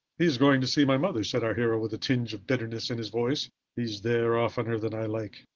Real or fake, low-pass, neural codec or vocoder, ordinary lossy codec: real; 7.2 kHz; none; Opus, 16 kbps